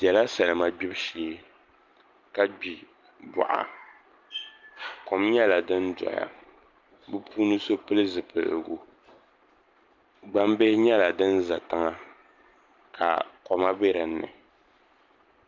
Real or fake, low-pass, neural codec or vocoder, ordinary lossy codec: real; 7.2 kHz; none; Opus, 24 kbps